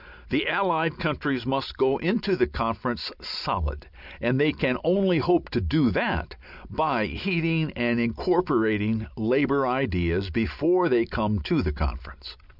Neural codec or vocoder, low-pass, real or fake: none; 5.4 kHz; real